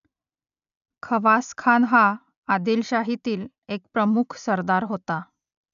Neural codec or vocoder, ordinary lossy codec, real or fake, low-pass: none; none; real; 7.2 kHz